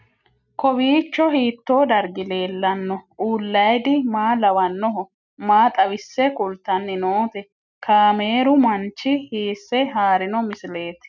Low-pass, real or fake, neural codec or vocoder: 7.2 kHz; real; none